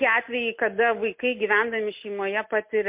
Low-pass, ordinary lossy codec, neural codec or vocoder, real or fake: 3.6 kHz; MP3, 32 kbps; none; real